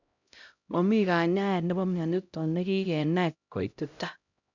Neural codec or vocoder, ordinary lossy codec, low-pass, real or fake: codec, 16 kHz, 0.5 kbps, X-Codec, HuBERT features, trained on LibriSpeech; none; 7.2 kHz; fake